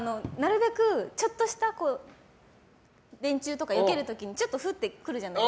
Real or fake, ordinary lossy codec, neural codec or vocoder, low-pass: real; none; none; none